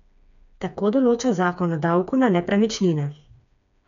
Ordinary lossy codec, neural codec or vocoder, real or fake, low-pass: none; codec, 16 kHz, 4 kbps, FreqCodec, smaller model; fake; 7.2 kHz